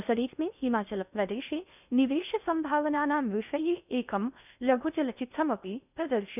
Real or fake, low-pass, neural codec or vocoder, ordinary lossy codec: fake; 3.6 kHz; codec, 16 kHz in and 24 kHz out, 0.6 kbps, FocalCodec, streaming, 2048 codes; none